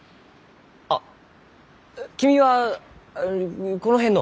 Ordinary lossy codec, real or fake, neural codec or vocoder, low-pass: none; real; none; none